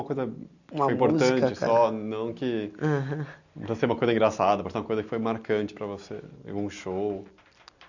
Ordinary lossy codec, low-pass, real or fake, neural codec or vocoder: none; 7.2 kHz; real; none